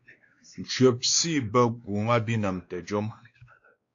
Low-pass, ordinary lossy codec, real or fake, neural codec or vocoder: 7.2 kHz; AAC, 48 kbps; fake; codec, 16 kHz, 2 kbps, X-Codec, WavLM features, trained on Multilingual LibriSpeech